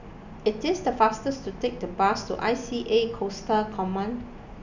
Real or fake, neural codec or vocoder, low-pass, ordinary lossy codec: real; none; 7.2 kHz; none